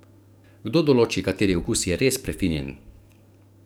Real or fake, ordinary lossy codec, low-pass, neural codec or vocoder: fake; none; none; codec, 44.1 kHz, 7.8 kbps, DAC